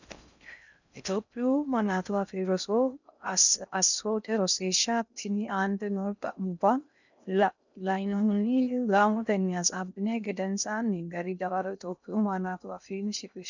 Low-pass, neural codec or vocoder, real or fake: 7.2 kHz; codec, 16 kHz in and 24 kHz out, 0.6 kbps, FocalCodec, streaming, 4096 codes; fake